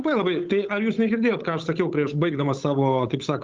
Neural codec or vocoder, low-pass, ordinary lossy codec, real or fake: codec, 16 kHz, 8 kbps, FunCodec, trained on Chinese and English, 25 frames a second; 7.2 kHz; Opus, 32 kbps; fake